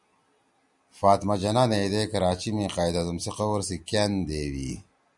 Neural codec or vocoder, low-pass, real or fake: none; 10.8 kHz; real